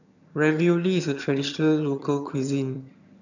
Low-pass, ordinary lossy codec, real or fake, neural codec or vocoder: 7.2 kHz; none; fake; vocoder, 22.05 kHz, 80 mel bands, HiFi-GAN